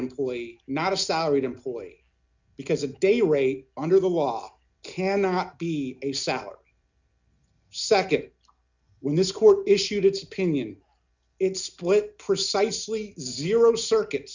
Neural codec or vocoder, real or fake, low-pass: none; real; 7.2 kHz